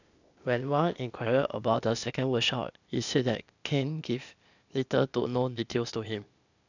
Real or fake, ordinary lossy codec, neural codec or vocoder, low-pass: fake; none; codec, 16 kHz, 0.8 kbps, ZipCodec; 7.2 kHz